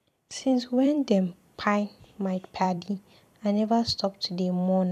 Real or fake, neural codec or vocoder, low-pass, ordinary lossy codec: fake; vocoder, 44.1 kHz, 128 mel bands every 256 samples, BigVGAN v2; 14.4 kHz; none